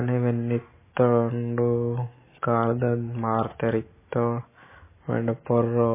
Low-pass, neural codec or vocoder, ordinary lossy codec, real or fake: 3.6 kHz; none; MP3, 16 kbps; real